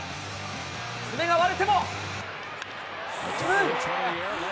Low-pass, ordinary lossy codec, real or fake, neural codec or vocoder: none; none; real; none